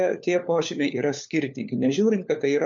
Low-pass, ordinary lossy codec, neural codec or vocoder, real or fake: 7.2 kHz; MP3, 64 kbps; codec, 16 kHz, 4 kbps, FunCodec, trained on LibriTTS, 50 frames a second; fake